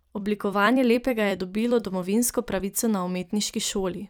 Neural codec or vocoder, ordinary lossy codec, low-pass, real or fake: vocoder, 44.1 kHz, 128 mel bands every 256 samples, BigVGAN v2; none; none; fake